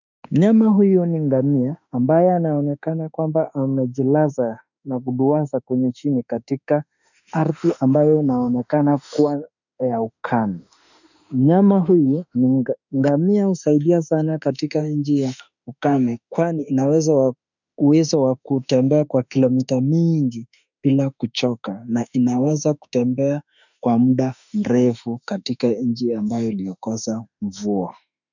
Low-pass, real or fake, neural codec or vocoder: 7.2 kHz; fake; autoencoder, 48 kHz, 32 numbers a frame, DAC-VAE, trained on Japanese speech